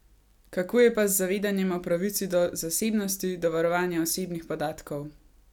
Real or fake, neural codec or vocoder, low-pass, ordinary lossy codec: real; none; 19.8 kHz; none